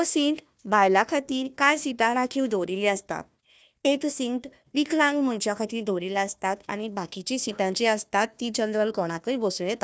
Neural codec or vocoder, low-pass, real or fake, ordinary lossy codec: codec, 16 kHz, 1 kbps, FunCodec, trained on Chinese and English, 50 frames a second; none; fake; none